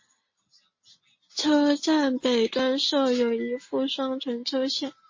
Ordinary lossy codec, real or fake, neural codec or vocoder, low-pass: MP3, 32 kbps; real; none; 7.2 kHz